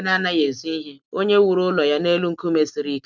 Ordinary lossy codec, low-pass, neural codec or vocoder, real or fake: none; 7.2 kHz; none; real